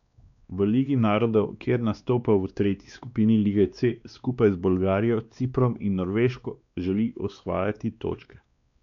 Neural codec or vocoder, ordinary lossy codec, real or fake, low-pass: codec, 16 kHz, 2 kbps, X-Codec, WavLM features, trained on Multilingual LibriSpeech; none; fake; 7.2 kHz